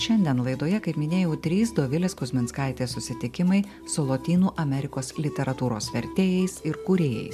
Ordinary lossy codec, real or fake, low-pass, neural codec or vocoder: MP3, 96 kbps; real; 14.4 kHz; none